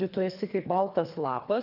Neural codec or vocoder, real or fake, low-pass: codec, 24 kHz, 3 kbps, HILCodec; fake; 5.4 kHz